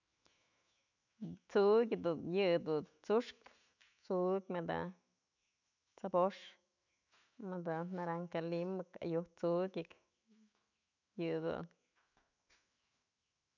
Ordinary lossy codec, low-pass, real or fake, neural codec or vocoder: none; 7.2 kHz; fake; autoencoder, 48 kHz, 128 numbers a frame, DAC-VAE, trained on Japanese speech